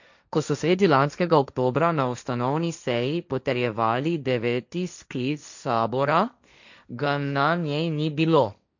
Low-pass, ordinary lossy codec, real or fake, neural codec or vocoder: 7.2 kHz; none; fake; codec, 16 kHz, 1.1 kbps, Voila-Tokenizer